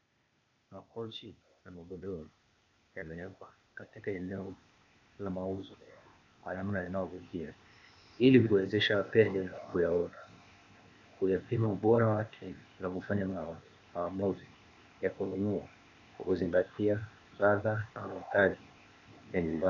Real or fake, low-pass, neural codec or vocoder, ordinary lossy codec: fake; 7.2 kHz; codec, 16 kHz, 0.8 kbps, ZipCodec; AAC, 48 kbps